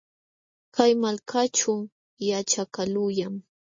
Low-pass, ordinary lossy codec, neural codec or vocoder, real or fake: 7.2 kHz; MP3, 32 kbps; none; real